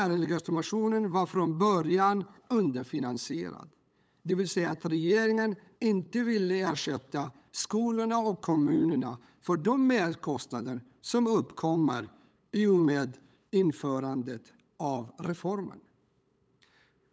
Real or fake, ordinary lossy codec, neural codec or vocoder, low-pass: fake; none; codec, 16 kHz, 8 kbps, FunCodec, trained on LibriTTS, 25 frames a second; none